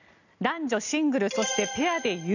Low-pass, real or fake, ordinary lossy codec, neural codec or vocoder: 7.2 kHz; real; none; none